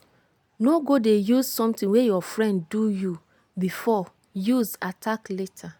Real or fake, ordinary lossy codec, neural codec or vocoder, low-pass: real; none; none; none